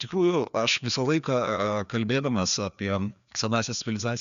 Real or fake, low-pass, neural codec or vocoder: fake; 7.2 kHz; codec, 16 kHz, 2 kbps, FreqCodec, larger model